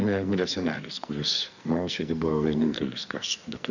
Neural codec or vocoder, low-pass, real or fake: codec, 44.1 kHz, 2.6 kbps, SNAC; 7.2 kHz; fake